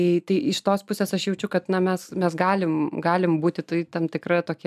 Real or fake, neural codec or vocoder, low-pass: real; none; 14.4 kHz